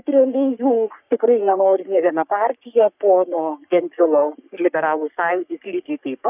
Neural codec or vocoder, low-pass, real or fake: codec, 44.1 kHz, 3.4 kbps, Pupu-Codec; 3.6 kHz; fake